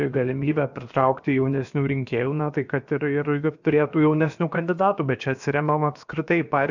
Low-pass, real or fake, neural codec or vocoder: 7.2 kHz; fake; codec, 16 kHz, about 1 kbps, DyCAST, with the encoder's durations